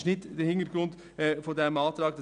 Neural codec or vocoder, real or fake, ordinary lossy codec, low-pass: none; real; none; 9.9 kHz